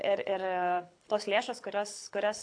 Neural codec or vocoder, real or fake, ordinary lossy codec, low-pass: codec, 24 kHz, 6 kbps, HILCodec; fake; AAC, 48 kbps; 9.9 kHz